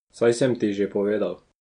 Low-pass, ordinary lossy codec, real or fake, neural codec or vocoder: 9.9 kHz; MP3, 64 kbps; real; none